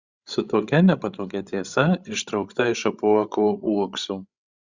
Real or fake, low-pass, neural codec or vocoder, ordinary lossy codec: fake; 7.2 kHz; codec, 16 kHz, 16 kbps, FreqCodec, larger model; Opus, 64 kbps